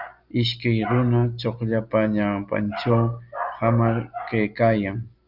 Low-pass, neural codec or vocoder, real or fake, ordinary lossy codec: 5.4 kHz; none; real; Opus, 24 kbps